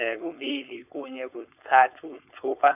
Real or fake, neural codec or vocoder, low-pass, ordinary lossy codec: fake; codec, 16 kHz, 4.8 kbps, FACodec; 3.6 kHz; none